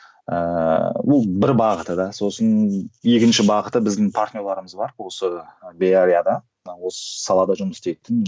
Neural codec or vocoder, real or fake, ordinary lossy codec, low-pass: none; real; none; none